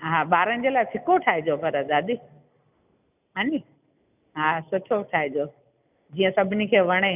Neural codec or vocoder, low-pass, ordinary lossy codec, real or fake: none; 3.6 kHz; none; real